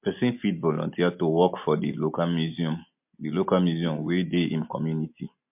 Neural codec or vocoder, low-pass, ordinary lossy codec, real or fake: none; 3.6 kHz; MP3, 32 kbps; real